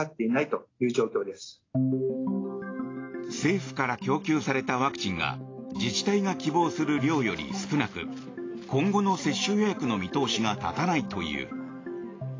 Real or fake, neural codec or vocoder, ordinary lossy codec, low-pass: real; none; AAC, 32 kbps; 7.2 kHz